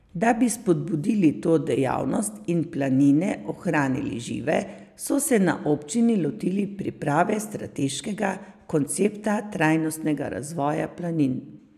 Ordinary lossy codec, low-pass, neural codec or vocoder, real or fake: none; 14.4 kHz; none; real